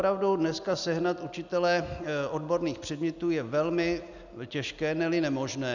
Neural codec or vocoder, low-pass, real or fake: none; 7.2 kHz; real